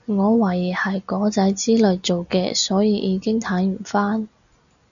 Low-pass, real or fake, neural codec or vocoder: 7.2 kHz; real; none